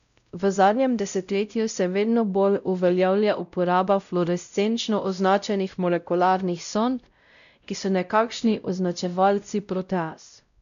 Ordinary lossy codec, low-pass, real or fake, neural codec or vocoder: none; 7.2 kHz; fake; codec, 16 kHz, 0.5 kbps, X-Codec, WavLM features, trained on Multilingual LibriSpeech